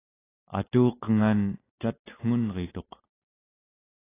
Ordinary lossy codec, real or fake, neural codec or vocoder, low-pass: AAC, 16 kbps; real; none; 3.6 kHz